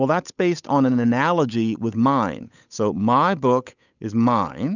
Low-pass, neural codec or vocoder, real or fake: 7.2 kHz; codec, 16 kHz, 8 kbps, FunCodec, trained on Chinese and English, 25 frames a second; fake